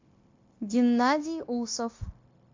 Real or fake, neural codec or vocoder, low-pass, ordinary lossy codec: fake; codec, 16 kHz, 0.9 kbps, LongCat-Audio-Codec; 7.2 kHz; MP3, 48 kbps